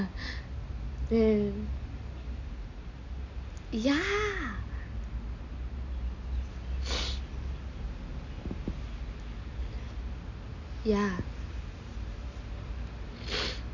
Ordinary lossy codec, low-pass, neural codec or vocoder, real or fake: none; 7.2 kHz; none; real